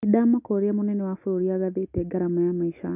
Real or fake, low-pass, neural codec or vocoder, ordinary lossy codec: real; 3.6 kHz; none; none